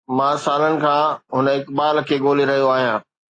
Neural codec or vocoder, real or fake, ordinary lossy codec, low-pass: none; real; AAC, 32 kbps; 9.9 kHz